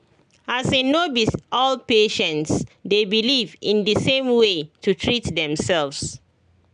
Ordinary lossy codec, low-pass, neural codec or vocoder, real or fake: none; 9.9 kHz; none; real